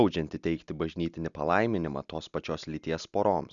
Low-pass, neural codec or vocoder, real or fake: 7.2 kHz; none; real